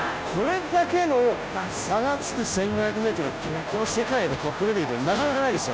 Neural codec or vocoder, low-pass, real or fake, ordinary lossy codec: codec, 16 kHz, 0.5 kbps, FunCodec, trained on Chinese and English, 25 frames a second; none; fake; none